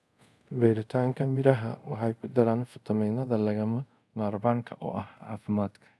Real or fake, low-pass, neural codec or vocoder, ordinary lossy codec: fake; none; codec, 24 kHz, 0.5 kbps, DualCodec; none